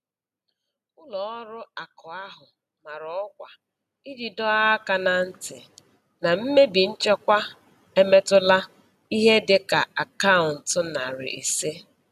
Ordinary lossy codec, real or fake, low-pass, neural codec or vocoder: none; real; 14.4 kHz; none